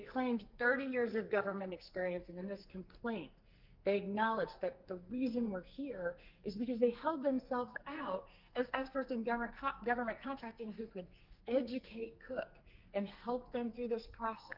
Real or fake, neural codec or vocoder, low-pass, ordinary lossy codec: fake; codec, 44.1 kHz, 2.6 kbps, SNAC; 5.4 kHz; Opus, 24 kbps